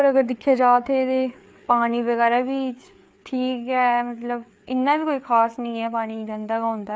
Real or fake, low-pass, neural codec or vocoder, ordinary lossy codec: fake; none; codec, 16 kHz, 4 kbps, FreqCodec, larger model; none